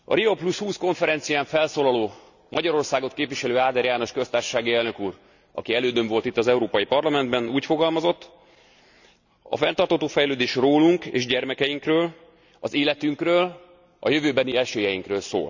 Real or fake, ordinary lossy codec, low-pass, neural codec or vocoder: real; none; 7.2 kHz; none